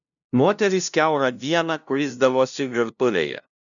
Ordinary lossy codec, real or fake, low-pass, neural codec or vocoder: MP3, 96 kbps; fake; 7.2 kHz; codec, 16 kHz, 0.5 kbps, FunCodec, trained on LibriTTS, 25 frames a second